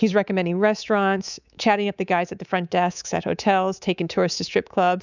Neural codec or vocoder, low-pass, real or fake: codec, 24 kHz, 3.1 kbps, DualCodec; 7.2 kHz; fake